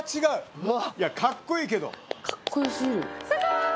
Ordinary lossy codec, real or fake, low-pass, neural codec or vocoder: none; real; none; none